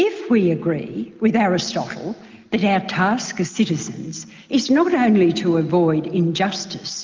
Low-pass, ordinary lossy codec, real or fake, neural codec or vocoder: 7.2 kHz; Opus, 32 kbps; real; none